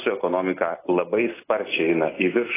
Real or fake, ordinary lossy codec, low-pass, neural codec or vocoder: real; AAC, 16 kbps; 3.6 kHz; none